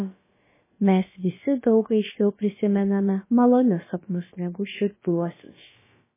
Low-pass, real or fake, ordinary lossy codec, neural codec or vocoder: 3.6 kHz; fake; MP3, 16 kbps; codec, 16 kHz, about 1 kbps, DyCAST, with the encoder's durations